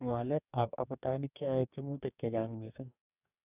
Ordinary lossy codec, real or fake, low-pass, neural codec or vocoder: none; fake; 3.6 kHz; codec, 44.1 kHz, 2.6 kbps, DAC